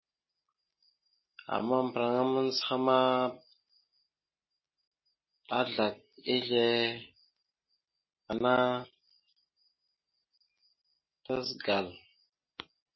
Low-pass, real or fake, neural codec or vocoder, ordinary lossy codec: 7.2 kHz; real; none; MP3, 24 kbps